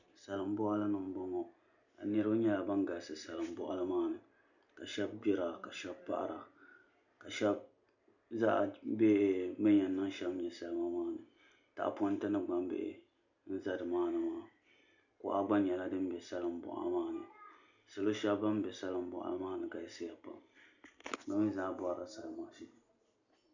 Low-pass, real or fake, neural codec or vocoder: 7.2 kHz; real; none